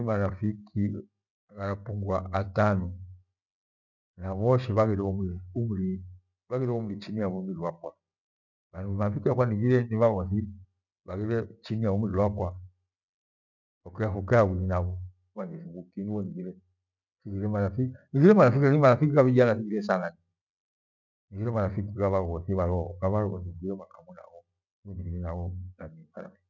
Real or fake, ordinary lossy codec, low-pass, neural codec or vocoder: real; none; 7.2 kHz; none